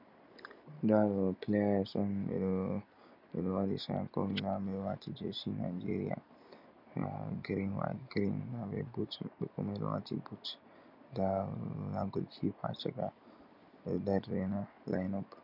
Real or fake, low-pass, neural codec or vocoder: real; 5.4 kHz; none